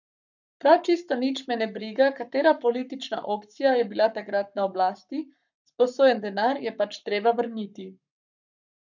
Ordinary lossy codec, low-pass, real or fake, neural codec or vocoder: none; 7.2 kHz; fake; codec, 44.1 kHz, 7.8 kbps, Pupu-Codec